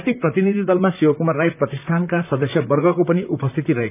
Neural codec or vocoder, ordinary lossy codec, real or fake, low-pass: vocoder, 44.1 kHz, 128 mel bands, Pupu-Vocoder; none; fake; 3.6 kHz